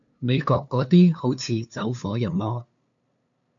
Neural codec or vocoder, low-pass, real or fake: codec, 16 kHz, 2 kbps, FunCodec, trained on LibriTTS, 25 frames a second; 7.2 kHz; fake